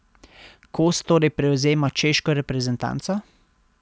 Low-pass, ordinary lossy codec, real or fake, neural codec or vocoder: none; none; real; none